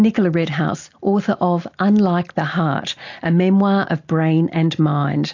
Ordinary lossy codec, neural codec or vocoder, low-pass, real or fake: AAC, 48 kbps; none; 7.2 kHz; real